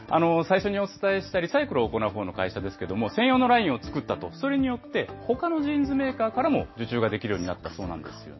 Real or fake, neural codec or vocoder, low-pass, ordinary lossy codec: real; none; 7.2 kHz; MP3, 24 kbps